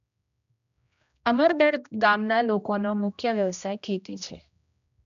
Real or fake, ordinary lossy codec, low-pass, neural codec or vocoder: fake; none; 7.2 kHz; codec, 16 kHz, 1 kbps, X-Codec, HuBERT features, trained on general audio